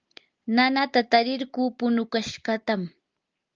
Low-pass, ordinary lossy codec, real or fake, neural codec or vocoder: 7.2 kHz; Opus, 24 kbps; real; none